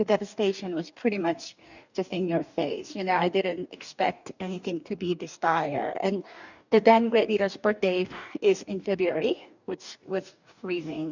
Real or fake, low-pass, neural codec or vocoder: fake; 7.2 kHz; codec, 44.1 kHz, 2.6 kbps, DAC